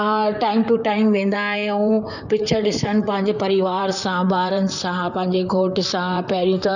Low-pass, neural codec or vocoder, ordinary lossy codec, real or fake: 7.2 kHz; vocoder, 44.1 kHz, 128 mel bands, Pupu-Vocoder; none; fake